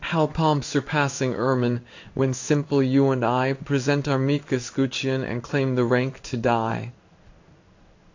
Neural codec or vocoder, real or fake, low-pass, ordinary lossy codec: codec, 16 kHz in and 24 kHz out, 1 kbps, XY-Tokenizer; fake; 7.2 kHz; AAC, 48 kbps